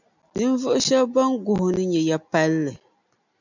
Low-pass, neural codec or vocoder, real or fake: 7.2 kHz; none; real